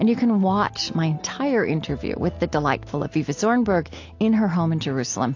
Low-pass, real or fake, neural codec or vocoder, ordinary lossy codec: 7.2 kHz; real; none; AAC, 48 kbps